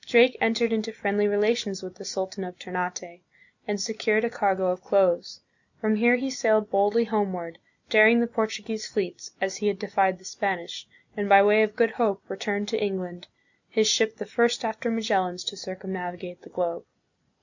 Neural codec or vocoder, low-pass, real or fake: none; 7.2 kHz; real